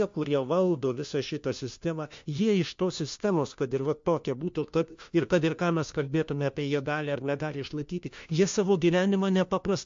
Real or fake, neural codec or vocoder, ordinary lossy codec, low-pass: fake; codec, 16 kHz, 1 kbps, FunCodec, trained on LibriTTS, 50 frames a second; MP3, 48 kbps; 7.2 kHz